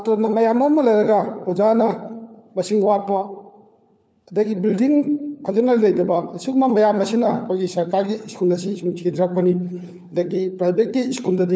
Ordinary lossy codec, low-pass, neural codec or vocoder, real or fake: none; none; codec, 16 kHz, 4 kbps, FunCodec, trained on LibriTTS, 50 frames a second; fake